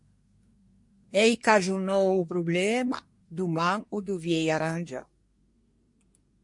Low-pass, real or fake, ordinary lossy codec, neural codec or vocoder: 10.8 kHz; fake; MP3, 48 kbps; codec, 24 kHz, 1 kbps, SNAC